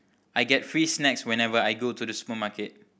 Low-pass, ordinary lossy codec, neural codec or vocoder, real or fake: none; none; none; real